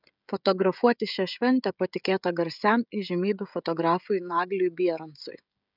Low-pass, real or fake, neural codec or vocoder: 5.4 kHz; fake; codec, 16 kHz, 16 kbps, FreqCodec, smaller model